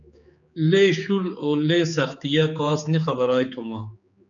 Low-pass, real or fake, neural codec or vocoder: 7.2 kHz; fake; codec, 16 kHz, 4 kbps, X-Codec, HuBERT features, trained on general audio